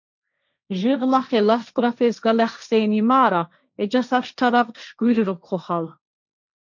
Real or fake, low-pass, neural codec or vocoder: fake; 7.2 kHz; codec, 16 kHz, 1.1 kbps, Voila-Tokenizer